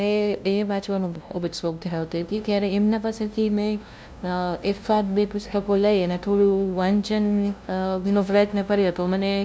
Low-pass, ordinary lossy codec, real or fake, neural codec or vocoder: none; none; fake; codec, 16 kHz, 0.5 kbps, FunCodec, trained on LibriTTS, 25 frames a second